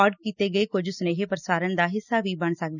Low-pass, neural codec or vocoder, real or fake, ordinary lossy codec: 7.2 kHz; none; real; none